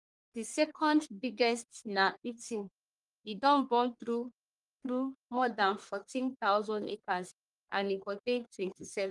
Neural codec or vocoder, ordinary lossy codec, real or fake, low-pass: codec, 44.1 kHz, 1.7 kbps, Pupu-Codec; Opus, 32 kbps; fake; 10.8 kHz